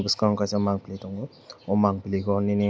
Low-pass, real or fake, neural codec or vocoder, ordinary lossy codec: none; real; none; none